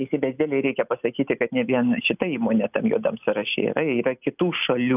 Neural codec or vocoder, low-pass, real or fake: none; 3.6 kHz; real